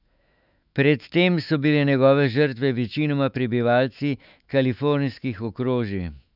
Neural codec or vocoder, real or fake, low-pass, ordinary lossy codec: autoencoder, 48 kHz, 128 numbers a frame, DAC-VAE, trained on Japanese speech; fake; 5.4 kHz; none